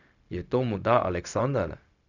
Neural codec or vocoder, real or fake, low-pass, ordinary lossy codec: codec, 16 kHz, 0.4 kbps, LongCat-Audio-Codec; fake; 7.2 kHz; none